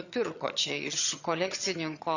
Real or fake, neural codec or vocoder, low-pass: fake; vocoder, 22.05 kHz, 80 mel bands, HiFi-GAN; 7.2 kHz